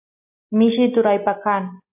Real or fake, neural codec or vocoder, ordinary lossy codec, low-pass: real; none; AAC, 24 kbps; 3.6 kHz